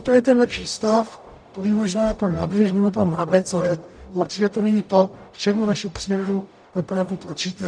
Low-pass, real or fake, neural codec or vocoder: 9.9 kHz; fake; codec, 44.1 kHz, 0.9 kbps, DAC